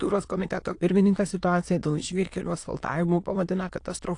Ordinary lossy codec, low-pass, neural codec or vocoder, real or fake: AAC, 48 kbps; 9.9 kHz; autoencoder, 22.05 kHz, a latent of 192 numbers a frame, VITS, trained on many speakers; fake